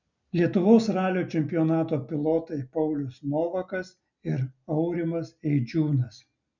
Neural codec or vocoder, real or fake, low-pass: none; real; 7.2 kHz